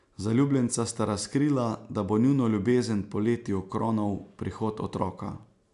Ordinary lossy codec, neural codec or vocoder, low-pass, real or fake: none; none; 10.8 kHz; real